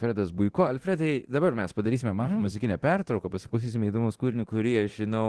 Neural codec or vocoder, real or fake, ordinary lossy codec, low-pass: codec, 24 kHz, 0.9 kbps, DualCodec; fake; Opus, 16 kbps; 10.8 kHz